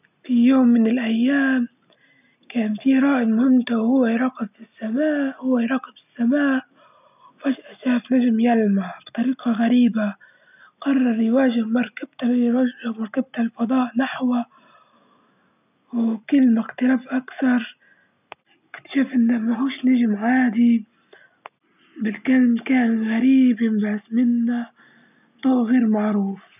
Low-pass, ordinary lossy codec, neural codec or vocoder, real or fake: 3.6 kHz; none; none; real